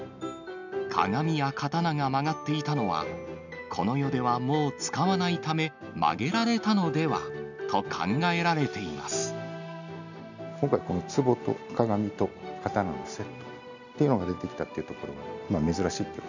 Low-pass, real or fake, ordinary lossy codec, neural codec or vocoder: 7.2 kHz; real; none; none